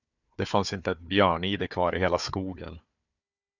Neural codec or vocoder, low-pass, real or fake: codec, 16 kHz, 4 kbps, FunCodec, trained on Chinese and English, 50 frames a second; 7.2 kHz; fake